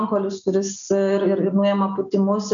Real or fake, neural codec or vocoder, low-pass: real; none; 7.2 kHz